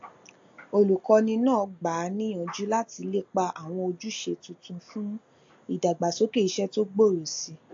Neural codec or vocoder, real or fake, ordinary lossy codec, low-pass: none; real; AAC, 48 kbps; 7.2 kHz